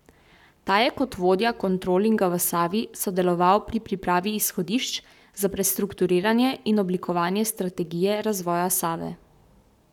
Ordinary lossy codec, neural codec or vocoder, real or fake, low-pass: none; codec, 44.1 kHz, 7.8 kbps, Pupu-Codec; fake; 19.8 kHz